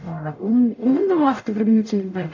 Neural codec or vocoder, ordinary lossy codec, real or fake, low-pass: codec, 44.1 kHz, 0.9 kbps, DAC; AAC, 48 kbps; fake; 7.2 kHz